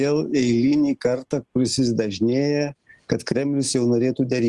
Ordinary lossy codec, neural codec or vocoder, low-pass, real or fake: Opus, 24 kbps; none; 10.8 kHz; real